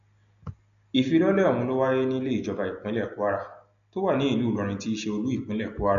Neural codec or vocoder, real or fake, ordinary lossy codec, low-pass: none; real; none; 7.2 kHz